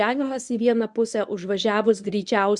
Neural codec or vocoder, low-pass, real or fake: codec, 24 kHz, 0.9 kbps, WavTokenizer, medium speech release version 1; 10.8 kHz; fake